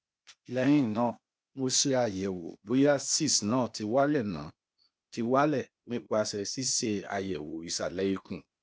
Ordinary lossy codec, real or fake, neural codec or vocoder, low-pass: none; fake; codec, 16 kHz, 0.8 kbps, ZipCodec; none